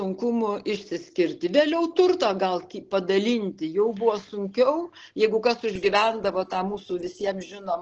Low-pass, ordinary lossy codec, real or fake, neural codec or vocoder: 7.2 kHz; Opus, 16 kbps; real; none